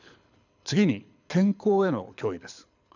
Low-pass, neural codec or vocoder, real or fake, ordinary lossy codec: 7.2 kHz; codec, 24 kHz, 6 kbps, HILCodec; fake; none